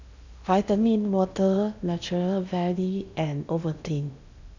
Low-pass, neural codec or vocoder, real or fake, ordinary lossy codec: 7.2 kHz; codec, 16 kHz in and 24 kHz out, 0.8 kbps, FocalCodec, streaming, 65536 codes; fake; none